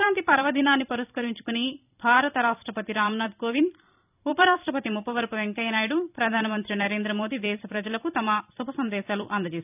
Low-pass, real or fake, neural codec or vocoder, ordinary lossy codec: 3.6 kHz; fake; vocoder, 44.1 kHz, 128 mel bands every 256 samples, BigVGAN v2; none